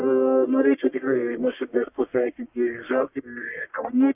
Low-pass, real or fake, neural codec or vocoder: 3.6 kHz; fake; codec, 44.1 kHz, 1.7 kbps, Pupu-Codec